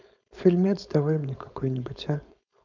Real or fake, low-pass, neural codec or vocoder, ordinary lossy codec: fake; 7.2 kHz; codec, 16 kHz, 4.8 kbps, FACodec; none